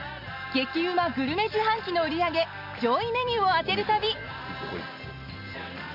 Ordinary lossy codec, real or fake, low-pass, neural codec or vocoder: none; real; 5.4 kHz; none